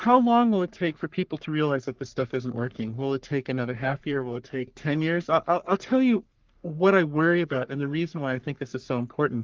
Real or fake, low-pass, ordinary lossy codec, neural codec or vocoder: fake; 7.2 kHz; Opus, 32 kbps; codec, 44.1 kHz, 3.4 kbps, Pupu-Codec